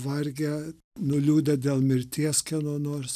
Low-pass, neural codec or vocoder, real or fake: 14.4 kHz; none; real